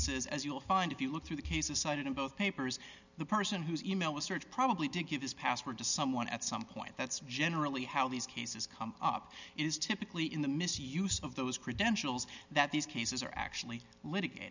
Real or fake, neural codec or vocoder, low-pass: real; none; 7.2 kHz